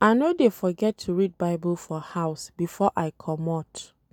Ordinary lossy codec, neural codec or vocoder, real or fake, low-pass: none; none; real; 19.8 kHz